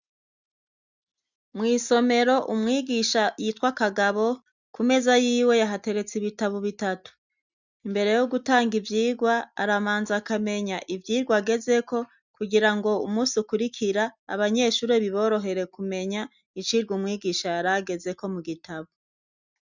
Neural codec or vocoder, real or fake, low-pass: none; real; 7.2 kHz